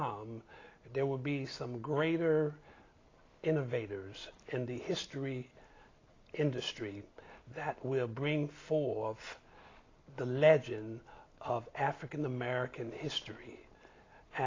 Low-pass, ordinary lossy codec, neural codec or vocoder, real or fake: 7.2 kHz; AAC, 32 kbps; none; real